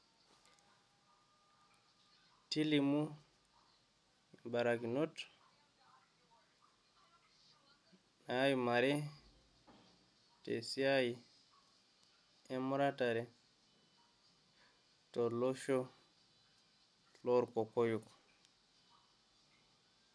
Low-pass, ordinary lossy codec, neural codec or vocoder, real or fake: none; none; none; real